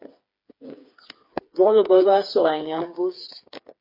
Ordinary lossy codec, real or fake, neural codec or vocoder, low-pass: MP3, 32 kbps; fake; codec, 16 kHz, 4 kbps, FreqCodec, smaller model; 5.4 kHz